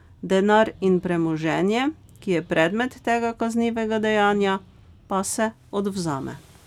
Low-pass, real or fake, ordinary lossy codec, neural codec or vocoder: 19.8 kHz; real; none; none